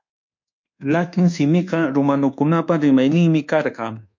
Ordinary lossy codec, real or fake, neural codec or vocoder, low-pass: MP3, 48 kbps; fake; codec, 24 kHz, 1.2 kbps, DualCodec; 7.2 kHz